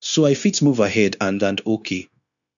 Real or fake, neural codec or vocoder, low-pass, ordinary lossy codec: fake; codec, 16 kHz, 0.9 kbps, LongCat-Audio-Codec; 7.2 kHz; none